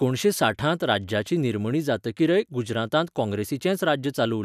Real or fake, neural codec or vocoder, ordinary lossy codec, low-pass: real; none; none; 14.4 kHz